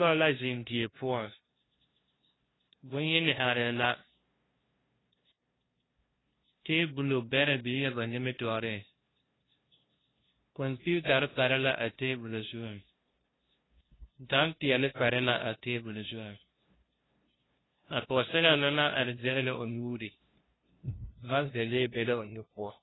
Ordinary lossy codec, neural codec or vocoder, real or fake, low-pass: AAC, 16 kbps; codec, 16 kHz, 0.5 kbps, FunCodec, trained on LibriTTS, 25 frames a second; fake; 7.2 kHz